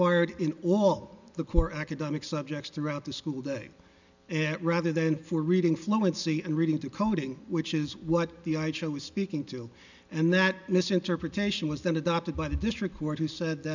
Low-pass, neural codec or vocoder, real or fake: 7.2 kHz; none; real